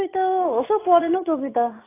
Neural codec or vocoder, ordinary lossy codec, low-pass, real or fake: none; AAC, 16 kbps; 3.6 kHz; real